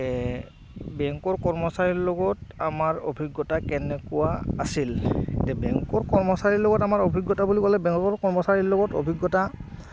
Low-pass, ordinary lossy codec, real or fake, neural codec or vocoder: none; none; real; none